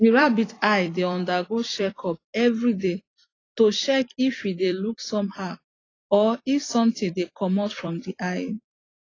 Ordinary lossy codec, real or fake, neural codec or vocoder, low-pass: AAC, 32 kbps; fake; vocoder, 44.1 kHz, 128 mel bands every 256 samples, BigVGAN v2; 7.2 kHz